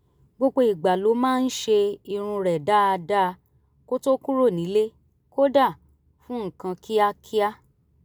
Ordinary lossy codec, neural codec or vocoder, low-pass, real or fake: none; none; none; real